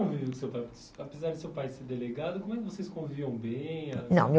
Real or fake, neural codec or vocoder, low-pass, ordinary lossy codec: real; none; none; none